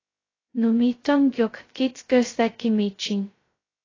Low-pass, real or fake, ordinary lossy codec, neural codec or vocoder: 7.2 kHz; fake; AAC, 32 kbps; codec, 16 kHz, 0.2 kbps, FocalCodec